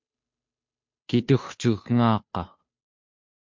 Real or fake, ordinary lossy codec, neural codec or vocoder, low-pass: fake; MP3, 48 kbps; codec, 16 kHz, 2 kbps, FunCodec, trained on Chinese and English, 25 frames a second; 7.2 kHz